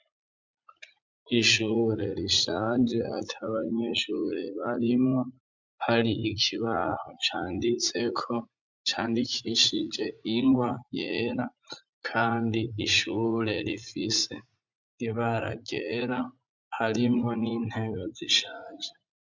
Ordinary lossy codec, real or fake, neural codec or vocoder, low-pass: MP3, 64 kbps; fake; vocoder, 44.1 kHz, 80 mel bands, Vocos; 7.2 kHz